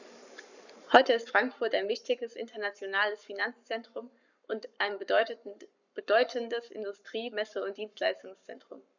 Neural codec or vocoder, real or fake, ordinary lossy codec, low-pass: codec, 44.1 kHz, 7.8 kbps, Pupu-Codec; fake; none; 7.2 kHz